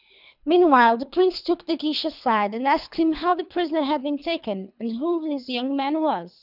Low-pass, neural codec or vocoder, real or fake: 5.4 kHz; codec, 24 kHz, 3 kbps, HILCodec; fake